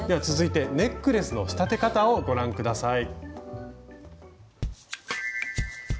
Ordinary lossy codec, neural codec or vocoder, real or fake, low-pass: none; none; real; none